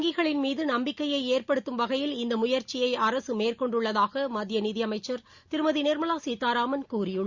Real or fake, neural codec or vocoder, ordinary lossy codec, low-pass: real; none; Opus, 64 kbps; 7.2 kHz